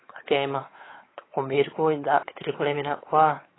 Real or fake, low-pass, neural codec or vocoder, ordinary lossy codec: fake; 7.2 kHz; codec, 16 kHz, 4 kbps, X-Codec, WavLM features, trained on Multilingual LibriSpeech; AAC, 16 kbps